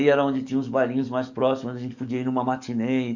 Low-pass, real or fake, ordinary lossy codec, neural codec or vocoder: 7.2 kHz; fake; none; codec, 44.1 kHz, 7.8 kbps, DAC